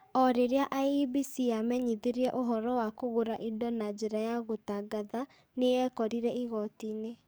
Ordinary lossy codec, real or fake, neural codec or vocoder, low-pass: none; fake; codec, 44.1 kHz, 7.8 kbps, DAC; none